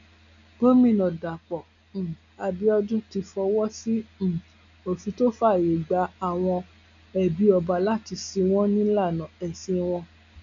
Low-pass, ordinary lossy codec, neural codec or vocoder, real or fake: 7.2 kHz; none; none; real